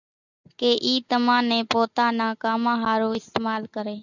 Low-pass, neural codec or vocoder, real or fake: 7.2 kHz; none; real